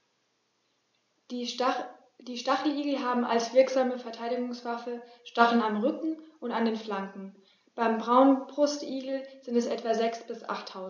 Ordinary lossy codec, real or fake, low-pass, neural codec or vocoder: MP3, 48 kbps; real; 7.2 kHz; none